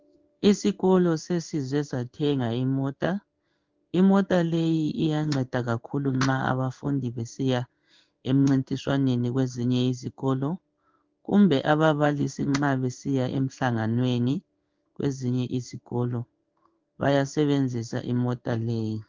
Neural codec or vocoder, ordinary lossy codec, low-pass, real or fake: codec, 16 kHz in and 24 kHz out, 1 kbps, XY-Tokenizer; Opus, 32 kbps; 7.2 kHz; fake